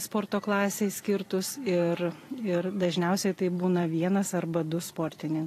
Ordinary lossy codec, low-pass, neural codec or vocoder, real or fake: AAC, 48 kbps; 14.4 kHz; vocoder, 44.1 kHz, 128 mel bands every 256 samples, BigVGAN v2; fake